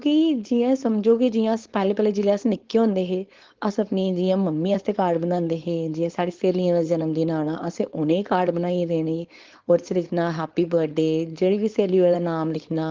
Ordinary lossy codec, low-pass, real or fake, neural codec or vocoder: Opus, 16 kbps; 7.2 kHz; fake; codec, 16 kHz, 4.8 kbps, FACodec